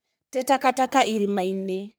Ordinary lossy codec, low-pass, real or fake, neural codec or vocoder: none; none; fake; codec, 44.1 kHz, 3.4 kbps, Pupu-Codec